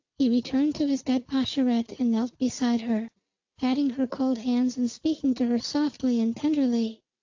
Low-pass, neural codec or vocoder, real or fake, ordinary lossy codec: 7.2 kHz; none; real; AAC, 48 kbps